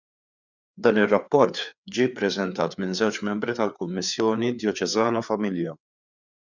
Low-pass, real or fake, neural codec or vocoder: 7.2 kHz; fake; codec, 16 kHz, 4 kbps, FreqCodec, larger model